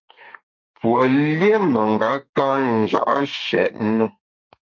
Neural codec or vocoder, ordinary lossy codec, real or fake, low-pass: codec, 32 kHz, 1.9 kbps, SNAC; MP3, 48 kbps; fake; 7.2 kHz